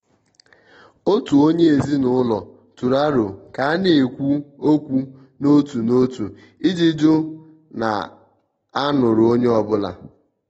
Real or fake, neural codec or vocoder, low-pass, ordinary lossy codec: real; none; 19.8 kHz; AAC, 24 kbps